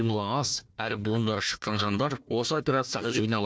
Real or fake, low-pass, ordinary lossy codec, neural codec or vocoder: fake; none; none; codec, 16 kHz, 1 kbps, FunCodec, trained on Chinese and English, 50 frames a second